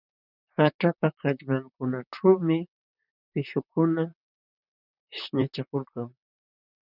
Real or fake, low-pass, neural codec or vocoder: fake; 5.4 kHz; vocoder, 44.1 kHz, 128 mel bands, Pupu-Vocoder